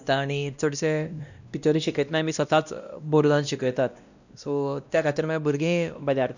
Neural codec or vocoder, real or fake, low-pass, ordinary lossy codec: codec, 16 kHz, 1 kbps, X-Codec, WavLM features, trained on Multilingual LibriSpeech; fake; 7.2 kHz; none